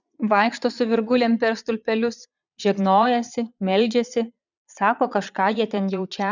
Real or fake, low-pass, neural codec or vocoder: fake; 7.2 kHz; vocoder, 22.05 kHz, 80 mel bands, Vocos